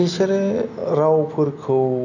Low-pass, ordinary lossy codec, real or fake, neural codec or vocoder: 7.2 kHz; none; real; none